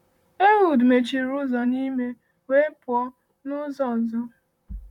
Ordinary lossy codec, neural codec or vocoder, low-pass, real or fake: none; vocoder, 44.1 kHz, 128 mel bands, Pupu-Vocoder; 19.8 kHz; fake